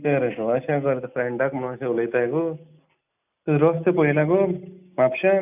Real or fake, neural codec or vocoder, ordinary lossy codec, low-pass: real; none; none; 3.6 kHz